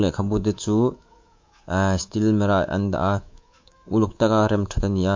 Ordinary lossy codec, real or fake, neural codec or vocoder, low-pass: MP3, 48 kbps; fake; codec, 24 kHz, 3.1 kbps, DualCodec; 7.2 kHz